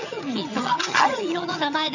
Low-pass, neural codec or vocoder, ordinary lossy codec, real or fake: 7.2 kHz; vocoder, 22.05 kHz, 80 mel bands, HiFi-GAN; none; fake